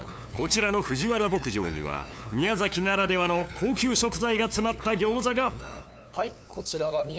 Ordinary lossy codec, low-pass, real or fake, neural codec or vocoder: none; none; fake; codec, 16 kHz, 2 kbps, FunCodec, trained on LibriTTS, 25 frames a second